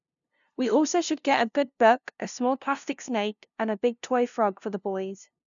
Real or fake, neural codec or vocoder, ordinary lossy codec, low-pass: fake; codec, 16 kHz, 0.5 kbps, FunCodec, trained on LibriTTS, 25 frames a second; none; 7.2 kHz